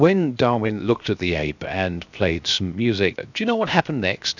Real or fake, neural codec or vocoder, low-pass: fake; codec, 16 kHz, 0.7 kbps, FocalCodec; 7.2 kHz